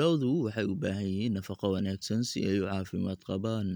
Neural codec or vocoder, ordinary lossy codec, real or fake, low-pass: none; none; real; none